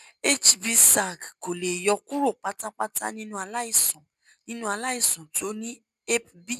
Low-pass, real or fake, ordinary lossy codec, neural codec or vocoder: 14.4 kHz; real; none; none